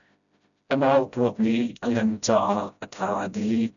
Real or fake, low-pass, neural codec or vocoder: fake; 7.2 kHz; codec, 16 kHz, 0.5 kbps, FreqCodec, smaller model